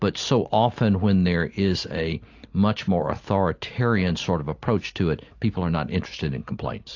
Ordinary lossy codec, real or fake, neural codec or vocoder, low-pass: AAC, 48 kbps; real; none; 7.2 kHz